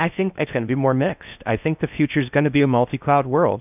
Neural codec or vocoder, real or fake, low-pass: codec, 16 kHz in and 24 kHz out, 0.6 kbps, FocalCodec, streaming, 4096 codes; fake; 3.6 kHz